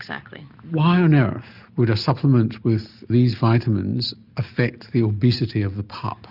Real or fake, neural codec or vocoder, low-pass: real; none; 5.4 kHz